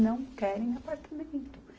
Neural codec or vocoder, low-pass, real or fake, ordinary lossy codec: none; none; real; none